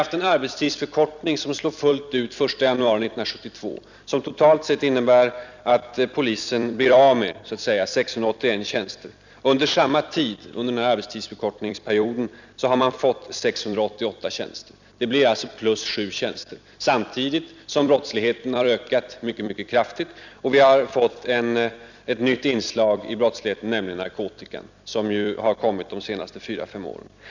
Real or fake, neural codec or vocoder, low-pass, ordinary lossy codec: fake; vocoder, 44.1 kHz, 128 mel bands every 256 samples, BigVGAN v2; 7.2 kHz; none